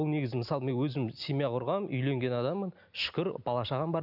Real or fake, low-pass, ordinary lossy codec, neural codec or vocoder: real; 5.4 kHz; none; none